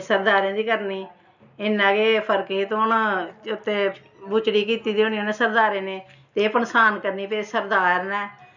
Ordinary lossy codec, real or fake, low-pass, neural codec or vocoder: none; real; 7.2 kHz; none